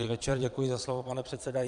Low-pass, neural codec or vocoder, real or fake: 9.9 kHz; vocoder, 22.05 kHz, 80 mel bands, Vocos; fake